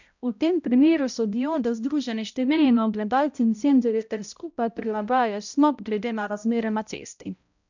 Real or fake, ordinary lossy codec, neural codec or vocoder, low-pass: fake; none; codec, 16 kHz, 0.5 kbps, X-Codec, HuBERT features, trained on balanced general audio; 7.2 kHz